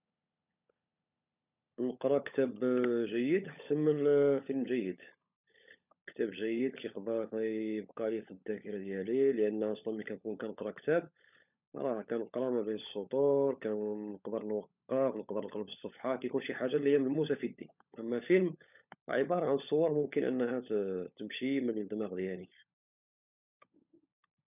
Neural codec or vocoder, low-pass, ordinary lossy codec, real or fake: codec, 16 kHz, 16 kbps, FunCodec, trained on LibriTTS, 50 frames a second; 3.6 kHz; none; fake